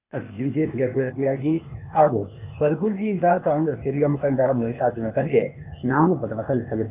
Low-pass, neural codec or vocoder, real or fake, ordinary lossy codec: 3.6 kHz; codec, 16 kHz, 0.8 kbps, ZipCodec; fake; none